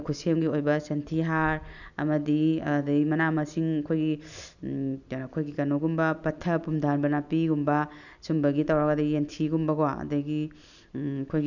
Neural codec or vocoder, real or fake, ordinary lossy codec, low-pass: none; real; none; 7.2 kHz